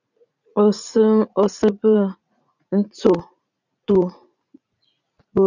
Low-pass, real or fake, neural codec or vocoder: 7.2 kHz; fake; vocoder, 44.1 kHz, 80 mel bands, Vocos